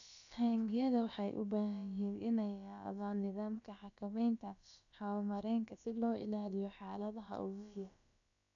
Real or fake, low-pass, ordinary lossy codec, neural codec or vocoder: fake; 7.2 kHz; none; codec, 16 kHz, about 1 kbps, DyCAST, with the encoder's durations